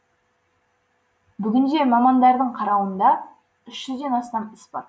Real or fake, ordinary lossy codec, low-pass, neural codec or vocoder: real; none; none; none